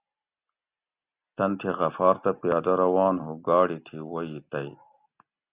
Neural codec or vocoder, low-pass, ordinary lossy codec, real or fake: none; 3.6 kHz; Opus, 64 kbps; real